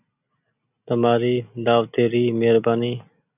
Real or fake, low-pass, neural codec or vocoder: real; 3.6 kHz; none